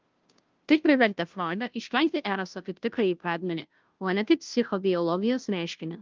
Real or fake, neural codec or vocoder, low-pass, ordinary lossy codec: fake; codec, 16 kHz, 0.5 kbps, FunCodec, trained on Chinese and English, 25 frames a second; 7.2 kHz; Opus, 24 kbps